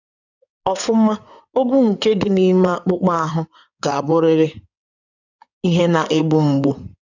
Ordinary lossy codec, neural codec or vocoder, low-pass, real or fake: none; codec, 16 kHz in and 24 kHz out, 2.2 kbps, FireRedTTS-2 codec; 7.2 kHz; fake